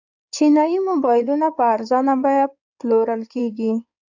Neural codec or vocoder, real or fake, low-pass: codec, 16 kHz in and 24 kHz out, 2.2 kbps, FireRedTTS-2 codec; fake; 7.2 kHz